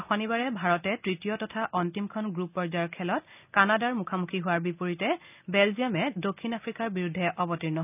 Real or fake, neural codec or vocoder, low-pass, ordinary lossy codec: real; none; 3.6 kHz; none